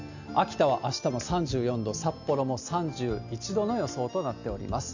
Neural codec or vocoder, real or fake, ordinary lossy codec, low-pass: none; real; none; 7.2 kHz